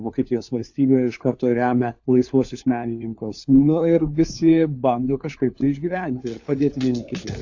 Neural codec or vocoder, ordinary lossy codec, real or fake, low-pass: codec, 16 kHz, 4 kbps, FunCodec, trained on LibriTTS, 50 frames a second; AAC, 48 kbps; fake; 7.2 kHz